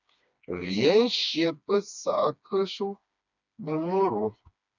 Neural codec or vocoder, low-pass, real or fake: codec, 16 kHz, 2 kbps, FreqCodec, smaller model; 7.2 kHz; fake